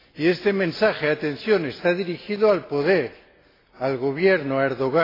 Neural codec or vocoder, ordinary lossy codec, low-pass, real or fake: none; AAC, 24 kbps; 5.4 kHz; real